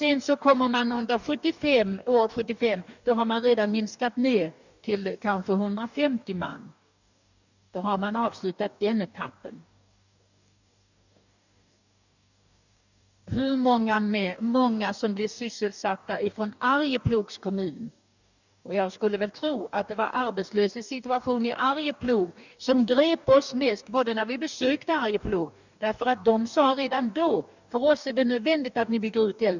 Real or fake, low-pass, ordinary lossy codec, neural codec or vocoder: fake; 7.2 kHz; none; codec, 44.1 kHz, 2.6 kbps, DAC